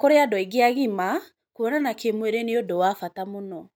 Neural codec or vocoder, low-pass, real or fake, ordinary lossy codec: none; none; real; none